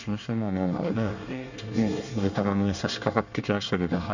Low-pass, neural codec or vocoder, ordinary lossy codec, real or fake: 7.2 kHz; codec, 24 kHz, 1 kbps, SNAC; none; fake